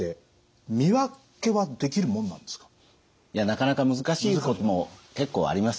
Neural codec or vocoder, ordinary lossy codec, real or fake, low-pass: none; none; real; none